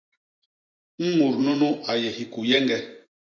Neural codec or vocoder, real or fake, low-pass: vocoder, 24 kHz, 100 mel bands, Vocos; fake; 7.2 kHz